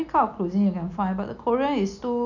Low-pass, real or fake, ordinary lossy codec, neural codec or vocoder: 7.2 kHz; real; none; none